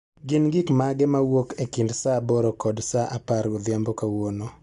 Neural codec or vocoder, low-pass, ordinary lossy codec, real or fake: none; 10.8 kHz; Opus, 64 kbps; real